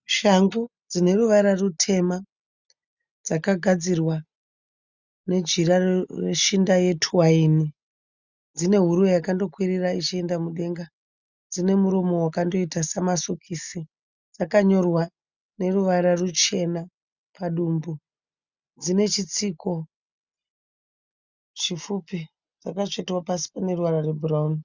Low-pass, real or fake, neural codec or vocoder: 7.2 kHz; real; none